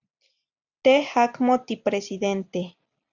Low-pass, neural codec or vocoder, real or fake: 7.2 kHz; none; real